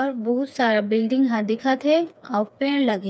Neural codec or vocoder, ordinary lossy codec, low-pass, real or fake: codec, 16 kHz, 4 kbps, FreqCodec, smaller model; none; none; fake